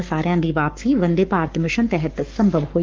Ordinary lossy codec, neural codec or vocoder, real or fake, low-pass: Opus, 32 kbps; codec, 44.1 kHz, 7.8 kbps, Pupu-Codec; fake; 7.2 kHz